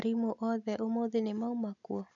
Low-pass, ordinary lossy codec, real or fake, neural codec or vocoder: 7.2 kHz; none; real; none